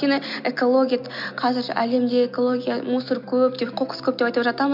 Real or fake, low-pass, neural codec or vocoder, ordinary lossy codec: real; 5.4 kHz; none; MP3, 48 kbps